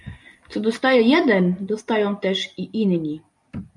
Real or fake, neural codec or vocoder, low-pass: real; none; 10.8 kHz